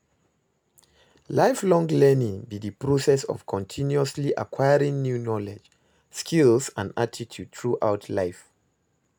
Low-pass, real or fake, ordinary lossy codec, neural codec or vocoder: none; real; none; none